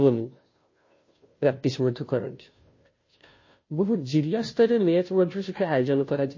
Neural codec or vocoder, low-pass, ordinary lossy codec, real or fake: codec, 16 kHz, 0.5 kbps, FunCodec, trained on Chinese and English, 25 frames a second; 7.2 kHz; MP3, 32 kbps; fake